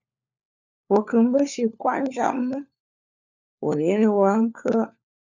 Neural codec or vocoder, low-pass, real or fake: codec, 16 kHz, 4 kbps, FunCodec, trained on LibriTTS, 50 frames a second; 7.2 kHz; fake